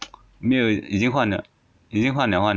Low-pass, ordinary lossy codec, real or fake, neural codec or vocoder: none; none; real; none